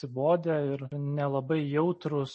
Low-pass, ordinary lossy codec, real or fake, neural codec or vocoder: 10.8 kHz; MP3, 32 kbps; real; none